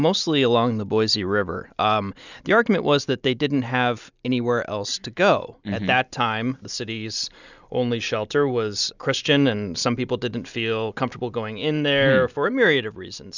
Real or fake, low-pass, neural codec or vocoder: real; 7.2 kHz; none